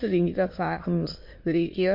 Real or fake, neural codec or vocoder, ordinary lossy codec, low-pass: fake; autoencoder, 22.05 kHz, a latent of 192 numbers a frame, VITS, trained on many speakers; MP3, 32 kbps; 5.4 kHz